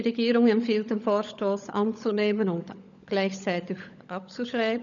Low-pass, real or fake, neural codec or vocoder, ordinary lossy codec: 7.2 kHz; fake; codec, 16 kHz, 16 kbps, FunCodec, trained on LibriTTS, 50 frames a second; none